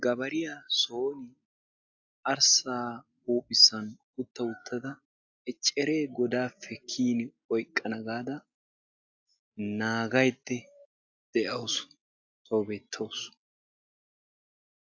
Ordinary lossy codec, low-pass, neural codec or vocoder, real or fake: AAC, 48 kbps; 7.2 kHz; none; real